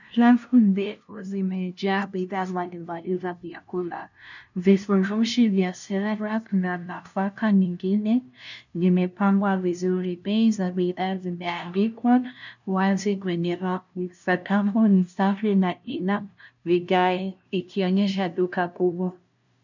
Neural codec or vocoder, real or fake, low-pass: codec, 16 kHz, 0.5 kbps, FunCodec, trained on LibriTTS, 25 frames a second; fake; 7.2 kHz